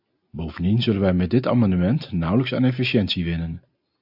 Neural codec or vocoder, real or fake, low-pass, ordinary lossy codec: none; real; 5.4 kHz; AAC, 48 kbps